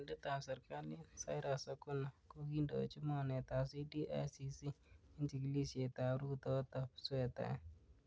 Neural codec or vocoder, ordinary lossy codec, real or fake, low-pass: none; none; real; none